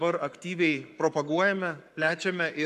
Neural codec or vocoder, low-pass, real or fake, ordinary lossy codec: codec, 44.1 kHz, 7.8 kbps, Pupu-Codec; 14.4 kHz; fake; MP3, 96 kbps